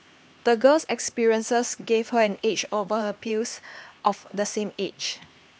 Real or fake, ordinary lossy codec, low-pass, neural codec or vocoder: fake; none; none; codec, 16 kHz, 2 kbps, X-Codec, HuBERT features, trained on LibriSpeech